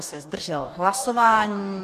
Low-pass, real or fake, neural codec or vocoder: 14.4 kHz; fake; codec, 44.1 kHz, 2.6 kbps, DAC